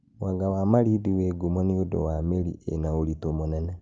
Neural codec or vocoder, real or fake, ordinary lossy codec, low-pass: none; real; Opus, 32 kbps; 7.2 kHz